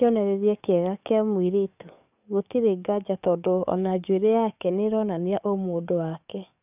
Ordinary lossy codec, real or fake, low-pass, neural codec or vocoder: none; fake; 3.6 kHz; codec, 44.1 kHz, 7.8 kbps, DAC